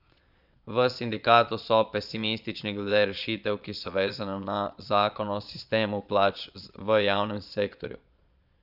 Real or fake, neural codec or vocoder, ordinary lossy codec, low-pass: fake; vocoder, 22.05 kHz, 80 mel bands, Vocos; none; 5.4 kHz